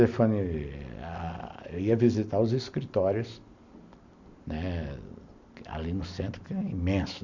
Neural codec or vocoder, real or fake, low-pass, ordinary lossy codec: none; real; 7.2 kHz; none